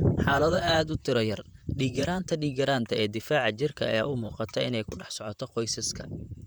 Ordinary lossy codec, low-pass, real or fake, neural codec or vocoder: none; none; fake; vocoder, 44.1 kHz, 128 mel bands, Pupu-Vocoder